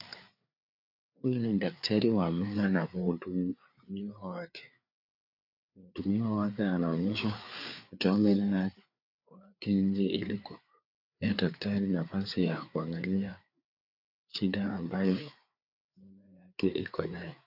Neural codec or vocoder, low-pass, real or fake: codec, 16 kHz, 4 kbps, FreqCodec, larger model; 5.4 kHz; fake